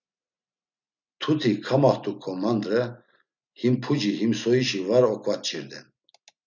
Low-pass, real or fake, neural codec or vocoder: 7.2 kHz; real; none